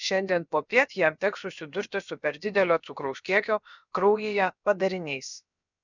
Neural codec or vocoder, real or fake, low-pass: codec, 16 kHz, about 1 kbps, DyCAST, with the encoder's durations; fake; 7.2 kHz